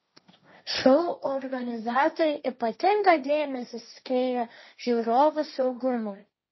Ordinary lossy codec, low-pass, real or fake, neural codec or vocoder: MP3, 24 kbps; 7.2 kHz; fake; codec, 16 kHz, 1.1 kbps, Voila-Tokenizer